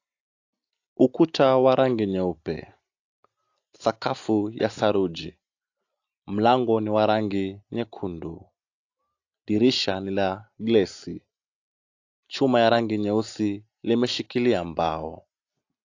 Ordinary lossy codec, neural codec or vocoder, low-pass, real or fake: AAC, 48 kbps; none; 7.2 kHz; real